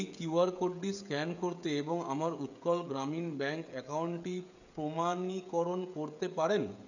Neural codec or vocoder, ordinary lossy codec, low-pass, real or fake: codec, 16 kHz, 16 kbps, FreqCodec, smaller model; none; 7.2 kHz; fake